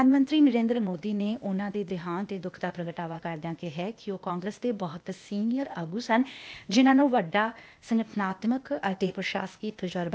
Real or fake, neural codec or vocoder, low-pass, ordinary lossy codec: fake; codec, 16 kHz, 0.8 kbps, ZipCodec; none; none